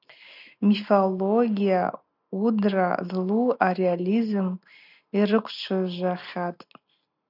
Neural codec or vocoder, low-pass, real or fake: none; 5.4 kHz; real